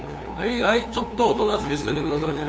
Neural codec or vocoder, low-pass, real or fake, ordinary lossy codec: codec, 16 kHz, 2 kbps, FunCodec, trained on LibriTTS, 25 frames a second; none; fake; none